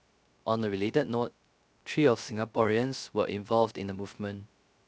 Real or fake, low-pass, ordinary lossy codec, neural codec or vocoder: fake; none; none; codec, 16 kHz, 0.3 kbps, FocalCodec